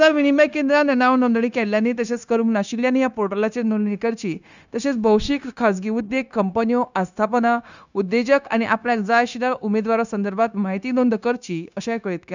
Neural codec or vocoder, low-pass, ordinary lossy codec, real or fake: codec, 16 kHz, 0.9 kbps, LongCat-Audio-Codec; 7.2 kHz; none; fake